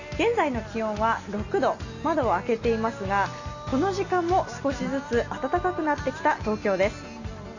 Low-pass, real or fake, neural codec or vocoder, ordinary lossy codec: 7.2 kHz; real; none; none